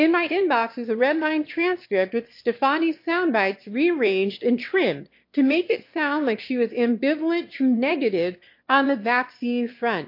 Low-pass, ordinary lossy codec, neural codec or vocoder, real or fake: 5.4 kHz; MP3, 32 kbps; autoencoder, 22.05 kHz, a latent of 192 numbers a frame, VITS, trained on one speaker; fake